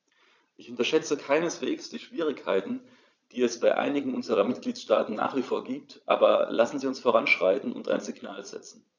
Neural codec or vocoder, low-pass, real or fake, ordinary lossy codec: vocoder, 22.05 kHz, 80 mel bands, Vocos; 7.2 kHz; fake; MP3, 64 kbps